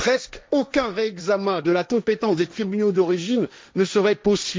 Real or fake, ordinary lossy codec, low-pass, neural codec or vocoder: fake; none; none; codec, 16 kHz, 1.1 kbps, Voila-Tokenizer